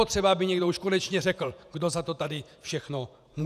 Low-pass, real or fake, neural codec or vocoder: 14.4 kHz; fake; vocoder, 48 kHz, 128 mel bands, Vocos